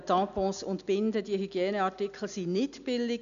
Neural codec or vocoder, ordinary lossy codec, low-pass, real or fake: none; none; 7.2 kHz; real